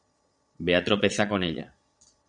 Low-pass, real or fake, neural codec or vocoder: 9.9 kHz; fake; vocoder, 22.05 kHz, 80 mel bands, Vocos